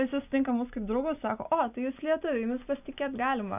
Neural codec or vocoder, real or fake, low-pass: none; real; 3.6 kHz